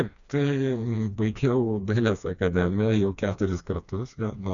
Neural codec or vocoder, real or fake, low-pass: codec, 16 kHz, 2 kbps, FreqCodec, smaller model; fake; 7.2 kHz